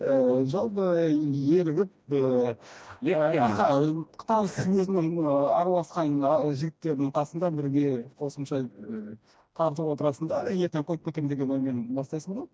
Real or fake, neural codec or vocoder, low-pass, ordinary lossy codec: fake; codec, 16 kHz, 1 kbps, FreqCodec, smaller model; none; none